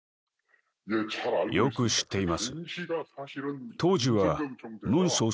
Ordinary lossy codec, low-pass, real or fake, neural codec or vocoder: none; none; real; none